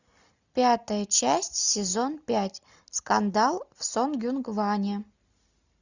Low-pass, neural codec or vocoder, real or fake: 7.2 kHz; none; real